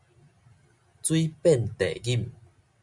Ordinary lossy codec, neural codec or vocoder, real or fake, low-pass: MP3, 96 kbps; none; real; 10.8 kHz